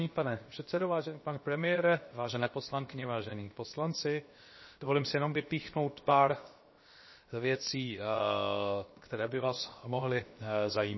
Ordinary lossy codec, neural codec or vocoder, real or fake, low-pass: MP3, 24 kbps; codec, 16 kHz, 0.7 kbps, FocalCodec; fake; 7.2 kHz